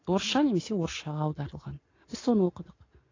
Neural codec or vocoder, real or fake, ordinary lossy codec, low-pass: none; real; AAC, 32 kbps; 7.2 kHz